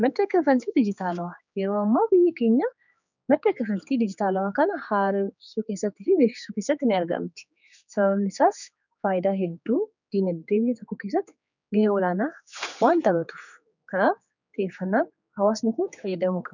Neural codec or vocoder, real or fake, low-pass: codec, 16 kHz, 4 kbps, X-Codec, HuBERT features, trained on general audio; fake; 7.2 kHz